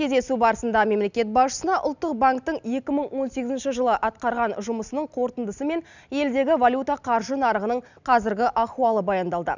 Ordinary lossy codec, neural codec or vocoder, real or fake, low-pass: none; none; real; 7.2 kHz